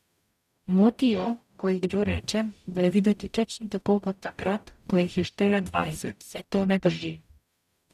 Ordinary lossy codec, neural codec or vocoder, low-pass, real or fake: none; codec, 44.1 kHz, 0.9 kbps, DAC; 14.4 kHz; fake